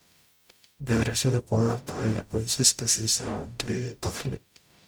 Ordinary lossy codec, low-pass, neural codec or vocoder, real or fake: none; none; codec, 44.1 kHz, 0.9 kbps, DAC; fake